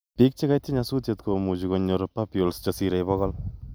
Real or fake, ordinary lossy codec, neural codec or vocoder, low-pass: real; none; none; none